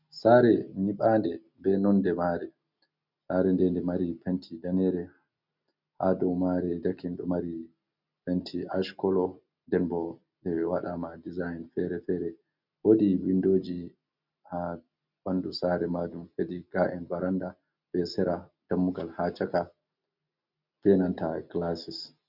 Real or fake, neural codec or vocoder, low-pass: real; none; 5.4 kHz